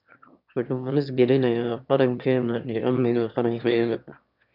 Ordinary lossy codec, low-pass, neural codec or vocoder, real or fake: none; 5.4 kHz; autoencoder, 22.05 kHz, a latent of 192 numbers a frame, VITS, trained on one speaker; fake